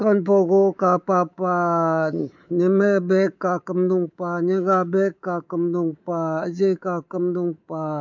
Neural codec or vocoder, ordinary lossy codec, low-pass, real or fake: codec, 24 kHz, 3.1 kbps, DualCodec; none; 7.2 kHz; fake